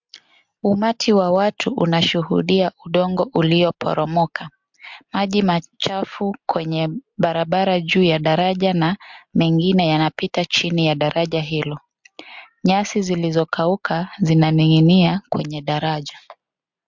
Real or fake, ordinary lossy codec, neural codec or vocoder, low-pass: real; MP3, 64 kbps; none; 7.2 kHz